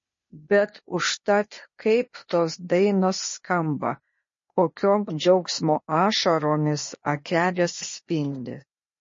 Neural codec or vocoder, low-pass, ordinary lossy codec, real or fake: codec, 16 kHz, 0.8 kbps, ZipCodec; 7.2 kHz; MP3, 32 kbps; fake